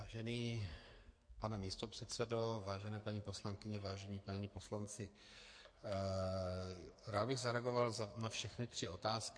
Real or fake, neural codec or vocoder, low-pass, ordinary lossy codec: fake; codec, 44.1 kHz, 2.6 kbps, SNAC; 9.9 kHz; MP3, 48 kbps